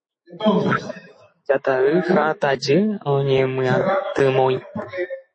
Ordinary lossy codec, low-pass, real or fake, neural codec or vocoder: MP3, 32 kbps; 9.9 kHz; fake; autoencoder, 48 kHz, 128 numbers a frame, DAC-VAE, trained on Japanese speech